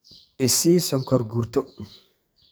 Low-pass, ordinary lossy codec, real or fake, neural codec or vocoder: none; none; fake; codec, 44.1 kHz, 2.6 kbps, SNAC